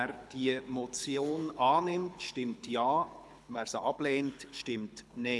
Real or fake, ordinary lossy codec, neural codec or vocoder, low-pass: fake; none; codec, 24 kHz, 6 kbps, HILCodec; none